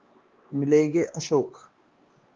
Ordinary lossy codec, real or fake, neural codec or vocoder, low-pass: Opus, 32 kbps; fake; codec, 16 kHz, 2 kbps, X-Codec, WavLM features, trained on Multilingual LibriSpeech; 7.2 kHz